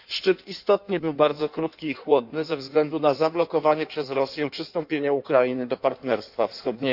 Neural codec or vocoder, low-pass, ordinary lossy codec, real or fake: codec, 16 kHz in and 24 kHz out, 1.1 kbps, FireRedTTS-2 codec; 5.4 kHz; none; fake